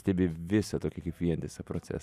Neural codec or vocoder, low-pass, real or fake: none; 14.4 kHz; real